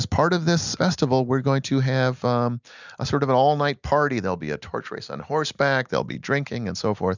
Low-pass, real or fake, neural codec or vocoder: 7.2 kHz; real; none